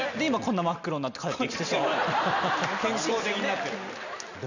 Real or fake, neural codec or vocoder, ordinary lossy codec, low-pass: real; none; none; 7.2 kHz